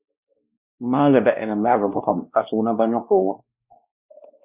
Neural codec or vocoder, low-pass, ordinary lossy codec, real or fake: codec, 16 kHz, 1 kbps, X-Codec, WavLM features, trained on Multilingual LibriSpeech; 3.6 kHz; Opus, 64 kbps; fake